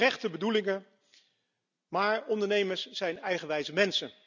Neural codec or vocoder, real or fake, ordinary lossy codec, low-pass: none; real; none; 7.2 kHz